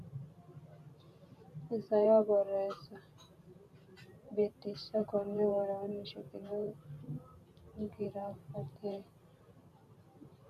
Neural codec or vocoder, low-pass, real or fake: vocoder, 44.1 kHz, 128 mel bands every 512 samples, BigVGAN v2; 14.4 kHz; fake